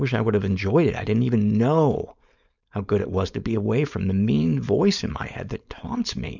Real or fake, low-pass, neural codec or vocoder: fake; 7.2 kHz; codec, 16 kHz, 4.8 kbps, FACodec